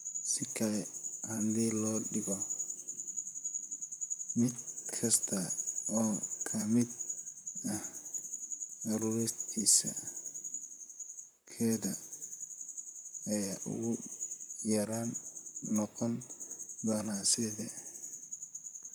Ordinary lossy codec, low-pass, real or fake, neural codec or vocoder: none; none; fake; vocoder, 44.1 kHz, 128 mel bands, Pupu-Vocoder